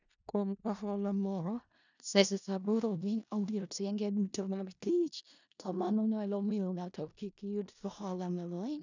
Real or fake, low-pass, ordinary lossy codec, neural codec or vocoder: fake; 7.2 kHz; none; codec, 16 kHz in and 24 kHz out, 0.4 kbps, LongCat-Audio-Codec, four codebook decoder